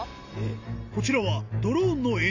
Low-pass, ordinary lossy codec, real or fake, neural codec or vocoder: 7.2 kHz; AAC, 48 kbps; real; none